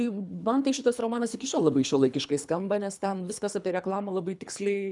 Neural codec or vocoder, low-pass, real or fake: codec, 24 kHz, 3 kbps, HILCodec; 10.8 kHz; fake